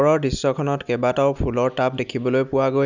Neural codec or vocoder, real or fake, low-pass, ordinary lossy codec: none; real; 7.2 kHz; none